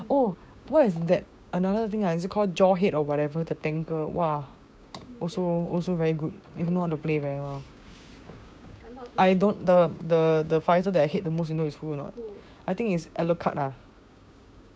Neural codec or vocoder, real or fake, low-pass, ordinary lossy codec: codec, 16 kHz, 6 kbps, DAC; fake; none; none